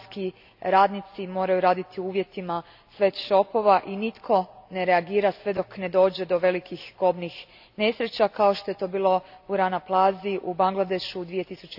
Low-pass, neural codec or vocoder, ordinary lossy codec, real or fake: 5.4 kHz; none; AAC, 48 kbps; real